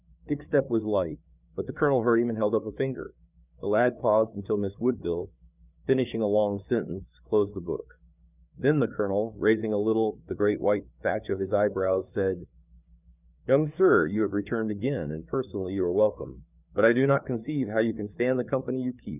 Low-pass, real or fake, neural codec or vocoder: 3.6 kHz; fake; codec, 16 kHz, 4 kbps, FreqCodec, larger model